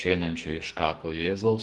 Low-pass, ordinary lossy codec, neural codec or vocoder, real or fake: 10.8 kHz; Opus, 24 kbps; codec, 44.1 kHz, 2.6 kbps, SNAC; fake